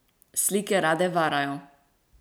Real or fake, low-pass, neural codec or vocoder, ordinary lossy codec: real; none; none; none